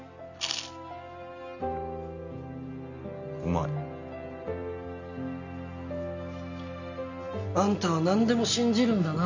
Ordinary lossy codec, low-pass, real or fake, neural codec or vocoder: none; 7.2 kHz; real; none